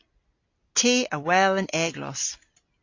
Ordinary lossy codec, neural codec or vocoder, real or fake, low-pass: AAC, 48 kbps; none; real; 7.2 kHz